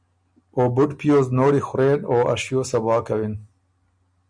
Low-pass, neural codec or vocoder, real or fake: 9.9 kHz; none; real